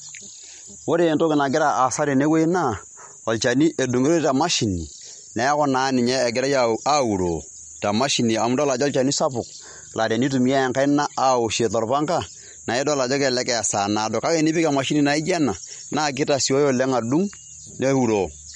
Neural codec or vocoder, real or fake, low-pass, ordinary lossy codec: none; real; 19.8 kHz; MP3, 48 kbps